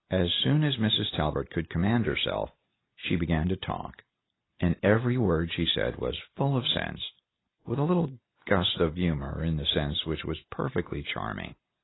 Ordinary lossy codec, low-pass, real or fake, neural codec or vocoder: AAC, 16 kbps; 7.2 kHz; real; none